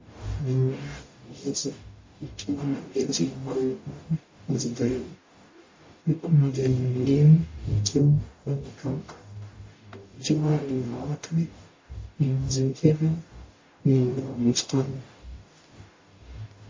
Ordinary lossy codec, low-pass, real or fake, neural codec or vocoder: MP3, 32 kbps; 7.2 kHz; fake; codec, 44.1 kHz, 0.9 kbps, DAC